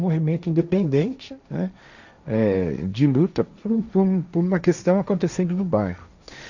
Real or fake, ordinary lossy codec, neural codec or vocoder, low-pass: fake; none; codec, 16 kHz, 1.1 kbps, Voila-Tokenizer; 7.2 kHz